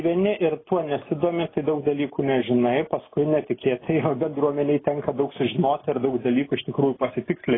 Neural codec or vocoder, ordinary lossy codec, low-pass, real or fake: none; AAC, 16 kbps; 7.2 kHz; real